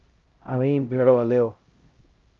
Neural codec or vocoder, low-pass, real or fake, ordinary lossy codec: codec, 16 kHz, 0.5 kbps, X-Codec, HuBERT features, trained on LibriSpeech; 7.2 kHz; fake; Opus, 32 kbps